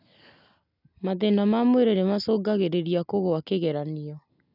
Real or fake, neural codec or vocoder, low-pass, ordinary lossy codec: real; none; 5.4 kHz; none